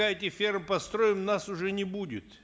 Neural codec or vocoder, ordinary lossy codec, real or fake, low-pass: none; none; real; none